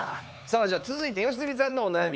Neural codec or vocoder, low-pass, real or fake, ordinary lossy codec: codec, 16 kHz, 4 kbps, X-Codec, HuBERT features, trained on LibriSpeech; none; fake; none